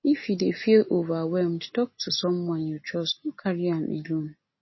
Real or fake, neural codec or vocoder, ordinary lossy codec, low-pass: real; none; MP3, 24 kbps; 7.2 kHz